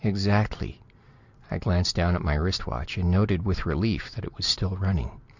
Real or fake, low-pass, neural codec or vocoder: real; 7.2 kHz; none